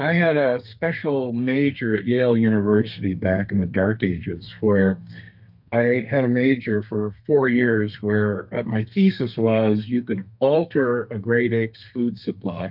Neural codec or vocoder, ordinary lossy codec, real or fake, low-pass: codec, 32 kHz, 1.9 kbps, SNAC; MP3, 48 kbps; fake; 5.4 kHz